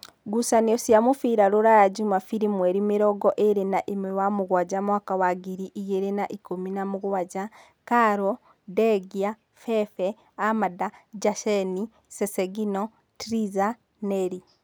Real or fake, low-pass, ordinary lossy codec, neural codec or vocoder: real; none; none; none